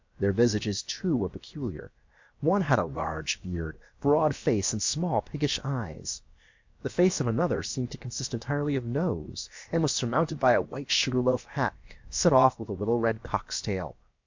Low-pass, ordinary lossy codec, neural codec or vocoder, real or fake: 7.2 kHz; MP3, 64 kbps; codec, 16 kHz, about 1 kbps, DyCAST, with the encoder's durations; fake